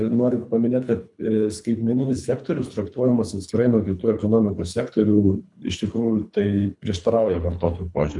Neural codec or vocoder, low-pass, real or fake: codec, 24 kHz, 3 kbps, HILCodec; 10.8 kHz; fake